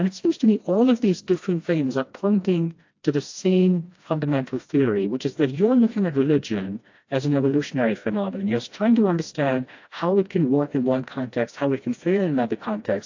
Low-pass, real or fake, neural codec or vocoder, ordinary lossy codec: 7.2 kHz; fake; codec, 16 kHz, 1 kbps, FreqCodec, smaller model; AAC, 48 kbps